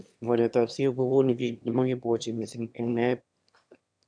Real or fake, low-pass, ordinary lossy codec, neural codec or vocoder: fake; 9.9 kHz; none; autoencoder, 22.05 kHz, a latent of 192 numbers a frame, VITS, trained on one speaker